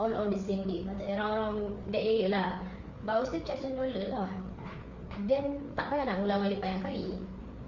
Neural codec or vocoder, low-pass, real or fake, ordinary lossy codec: codec, 16 kHz, 4 kbps, FreqCodec, larger model; 7.2 kHz; fake; Opus, 64 kbps